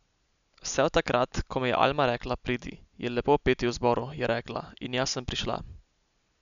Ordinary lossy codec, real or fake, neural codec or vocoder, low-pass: none; real; none; 7.2 kHz